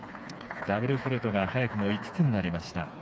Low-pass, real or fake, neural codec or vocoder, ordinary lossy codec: none; fake; codec, 16 kHz, 4 kbps, FreqCodec, smaller model; none